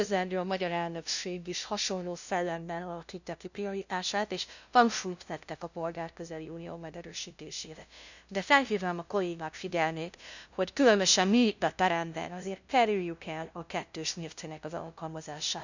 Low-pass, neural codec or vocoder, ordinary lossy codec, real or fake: 7.2 kHz; codec, 16 kHz, 0.5 kbps, FunCodec, trained on LibriTTS, 25 frames a second; none; fake